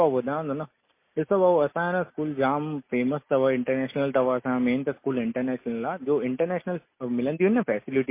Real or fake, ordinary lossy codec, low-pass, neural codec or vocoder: real; MP3, 24 kbps; 3.6 kHz; none